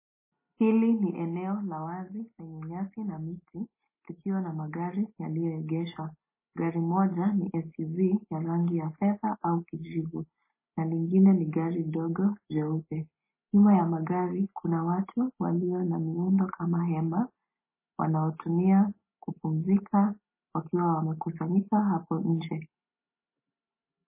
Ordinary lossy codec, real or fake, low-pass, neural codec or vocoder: MP3, 16 kbps; real; 3.6 kHz; none